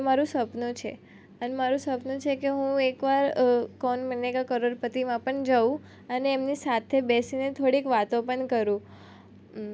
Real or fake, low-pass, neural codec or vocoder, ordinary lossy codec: real; none; none; none